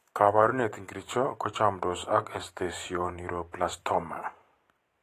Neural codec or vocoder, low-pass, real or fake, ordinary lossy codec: vocoder, 44.1 kHz, 128 mel bands every 512 samples, BigVGAN v2; 14.4 kHz; fake; AAC, 48 kbps